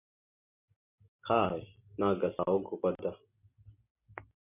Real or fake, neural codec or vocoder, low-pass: real; none; 3.6 kHz